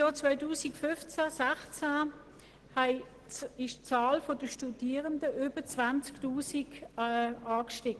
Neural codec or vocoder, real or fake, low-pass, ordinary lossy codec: none; real; 10.8 kHz; Opus, 16 kbps